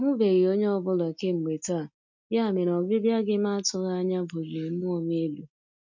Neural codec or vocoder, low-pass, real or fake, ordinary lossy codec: none; 7.2 kHz; real; none